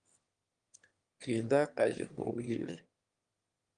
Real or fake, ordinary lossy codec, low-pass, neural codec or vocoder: fake; Opus, 24 kbps; 9.9 kHz; autoencoder, 22.05 kHz, a latent of 192 numbers a frame, VITS, trained on one speaker